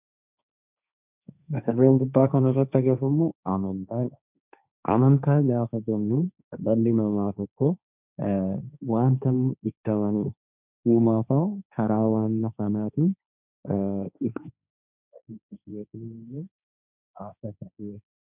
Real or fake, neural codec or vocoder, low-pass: fake; codec, 16 kHz, 1.1 kbps, Voila-Tokenizer; 3.6 kHz